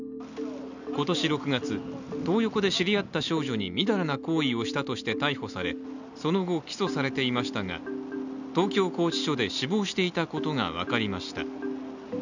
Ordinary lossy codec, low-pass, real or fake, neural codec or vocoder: none; 7.2 kHz; real; none